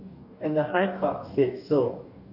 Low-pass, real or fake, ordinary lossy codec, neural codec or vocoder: 5.4 kHz; fake; none; codec, 44.1 kHz, 2.6 kbps, DAC